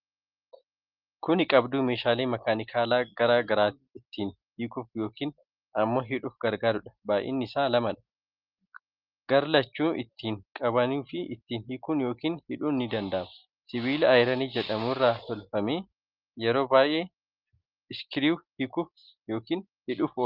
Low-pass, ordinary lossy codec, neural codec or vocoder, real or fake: 5.4 kHz; Opus, 24 kbps; none; real